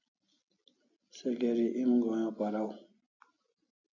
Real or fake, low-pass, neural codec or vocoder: real; 7.2 kHz; none